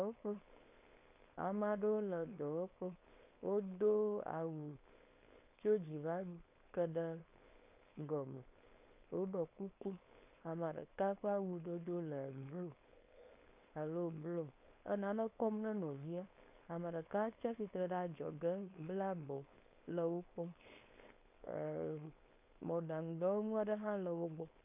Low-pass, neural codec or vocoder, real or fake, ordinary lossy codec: 3.6 kHz; codec, 16 kHz, 4.8 kbps, FACodec; fake; AAC, 32 kbps